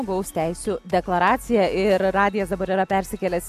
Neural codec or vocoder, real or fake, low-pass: none; real; 14.4 kHz